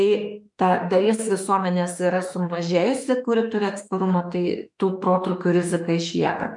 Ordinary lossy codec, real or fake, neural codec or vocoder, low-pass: MP3, 64 kbps; fake; autoencoder, 48 kHz, 32 numbers a frame, DAC-VAE, trained on Japanese speech; 10.8 kHz